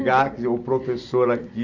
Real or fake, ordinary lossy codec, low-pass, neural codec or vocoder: real; none; 7.2 kHz; none